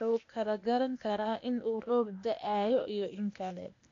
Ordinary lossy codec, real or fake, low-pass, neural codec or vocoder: AAC, 48 kbps; fake; 7.2 kHz; codec, 16 kHz, 0.8 kbps, ZipCodec